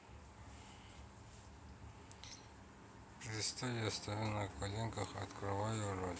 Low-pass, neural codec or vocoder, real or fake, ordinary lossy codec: none; none; real; none